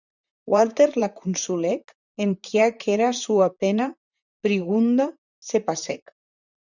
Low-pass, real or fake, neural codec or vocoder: 7.2 kHz; fake; vocoder, 44.1 kHz, 128 mel bands, Pupu-Vocoder